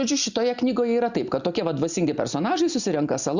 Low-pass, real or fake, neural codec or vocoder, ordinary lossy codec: 7.2 kHz; real; none; Opus, 64 kbps